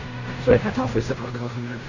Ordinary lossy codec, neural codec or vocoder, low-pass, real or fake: none; codec, 16 kHz in and 24 kHz out, 0.4 kbps, LongCat-Audio-Codec, fine tuned four codebook decoder; 7.2 kHz; fake